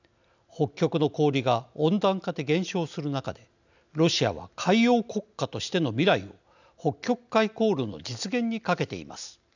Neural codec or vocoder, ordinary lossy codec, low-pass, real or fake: none; none; 7.2 kHz; real